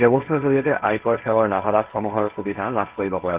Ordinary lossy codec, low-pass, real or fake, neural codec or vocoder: Opus, 24 kbps; 3.6 kHz; fake; codec, 16 kHz, 1.1 kbps, Voila-Tokenizer